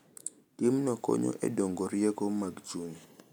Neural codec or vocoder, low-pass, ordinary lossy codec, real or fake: none; none; none; real